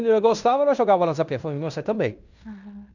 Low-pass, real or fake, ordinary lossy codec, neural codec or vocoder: 7.2 kHz; fake; none; codec, 16 kHz in and 24 kHz out, 0.9 kbps, LongCat-Audio-Codec, fine tuned four codebook decoder